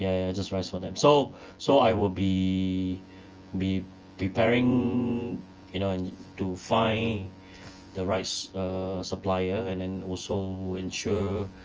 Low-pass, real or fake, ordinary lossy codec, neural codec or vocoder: 7.2 kHz; fake; Opus, 24 kbps; vocoder, 24 kHz, 100 mel bands, Vocos